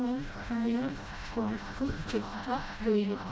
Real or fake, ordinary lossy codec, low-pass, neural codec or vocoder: fake; none; none; codec, 16 kHz, 0.5 kbps, FreqCodec, smaller model